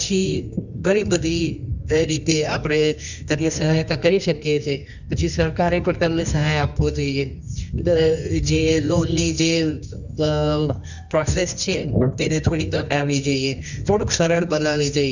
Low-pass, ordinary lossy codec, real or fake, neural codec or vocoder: 7.2 kHz; none; fake; codec, 24 kHz, 0.9 kbps, WavTokenizer, medium music audio release